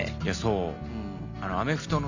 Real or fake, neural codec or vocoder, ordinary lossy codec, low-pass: real; none; none; 7.2 kHz